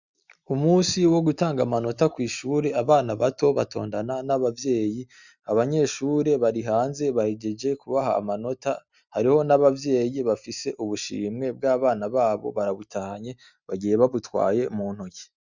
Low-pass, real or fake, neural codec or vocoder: 7.2 kHz; real; none